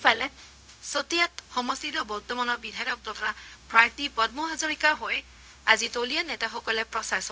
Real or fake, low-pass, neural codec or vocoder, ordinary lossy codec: fake; none; codec, 16 kHz, 0.4 kbps, LongCat-Audio-Codec; none